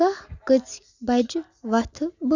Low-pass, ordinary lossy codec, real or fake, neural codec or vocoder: 7.2 kHz; none; real; none